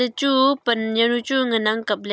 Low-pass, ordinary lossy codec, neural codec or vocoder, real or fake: none; none; none; real